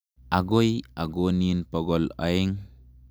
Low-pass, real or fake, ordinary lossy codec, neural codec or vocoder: none; real; none; none